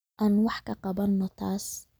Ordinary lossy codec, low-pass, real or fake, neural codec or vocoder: none; none; real; none